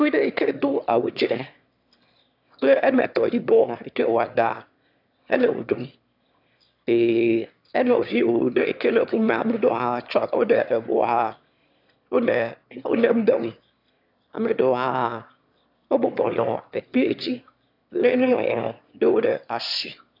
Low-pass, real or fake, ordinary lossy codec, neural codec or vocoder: 5.4 kHz; fake; AAC, 48 kbps; autoencoder, 22.05 kHz, a latent of 192 numbers a frame, VITS, trained on one speaker